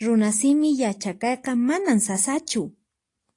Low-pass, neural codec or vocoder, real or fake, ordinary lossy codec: 10.8 kHz; none; real; AAC, 48 kbps